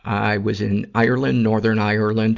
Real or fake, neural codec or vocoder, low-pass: real; none; 7.2 kHz